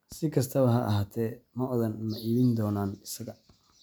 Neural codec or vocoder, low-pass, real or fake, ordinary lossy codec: none; none; real; none